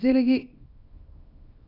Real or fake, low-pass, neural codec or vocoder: fake; 5.4 kHz; codec, 24 kHz, 0.9 kbps, WavTokenizer, small release